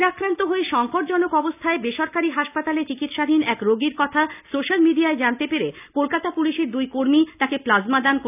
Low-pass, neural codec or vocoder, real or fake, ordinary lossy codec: 3.6 kHz; none; real; none